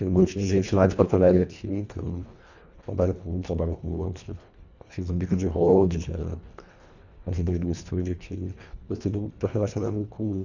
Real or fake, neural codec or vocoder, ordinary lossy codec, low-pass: fake; codec, 24 kHz, 1.5 kbps, HILCodec; none; 7.2 kHz